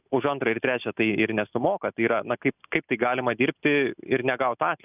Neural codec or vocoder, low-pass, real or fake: none; 3.6 kHz; real